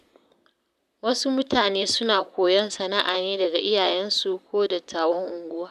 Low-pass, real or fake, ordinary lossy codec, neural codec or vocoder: 14.4 kHz; fake; none; vocoder, 44.1 kHz, 128 mel bands, Pupu-Vocoder